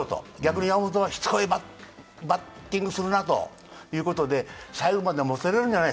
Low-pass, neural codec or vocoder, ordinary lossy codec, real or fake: none; none; none; real